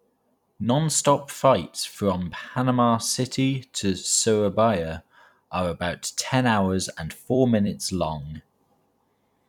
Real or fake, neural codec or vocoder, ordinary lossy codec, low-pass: fake; vocoder, 44.1 kHz, 128 mel bands every 256 samples, BigVGAN v2; none; 19.8 kHz